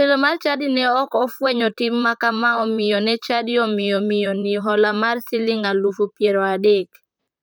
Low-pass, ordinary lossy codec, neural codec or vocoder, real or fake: none; none; vocoder, 44.1 kHz, 128 mel bands, Pupu-Vocoder; fake